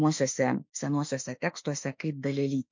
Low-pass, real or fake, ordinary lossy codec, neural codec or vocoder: 7.2 kHz; fake; MP3, 48 kbps; autoencoder, 48 kHz, 32 numbers a frame, DAC-VAE, trained on Japanese speech